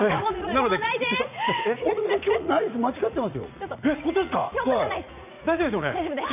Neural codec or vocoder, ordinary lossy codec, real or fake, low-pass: vocoder, 44.1 kHz, 80 mel bands, Vocos; none; fake; 3.6 kHz